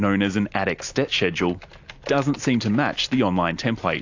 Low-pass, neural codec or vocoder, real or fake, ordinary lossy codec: 7.2 kHz; none; real; AAC, 48 kbps